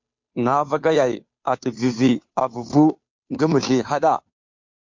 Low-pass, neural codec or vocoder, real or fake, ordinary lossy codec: 7.2 kHz; codec, 16 kHz, 2 kbps, FunCodec, trained on Chinese and English, 25 frames a second; fake; MP3, 48 kbps